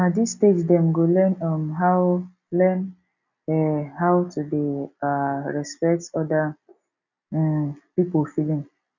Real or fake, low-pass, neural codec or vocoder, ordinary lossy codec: real; 7.2 kHz; none; none